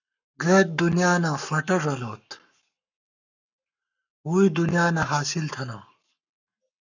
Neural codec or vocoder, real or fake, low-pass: codec, 44.1 kHz, 7.8 kbps, Pupu-Codec; fake; 7.2 kHz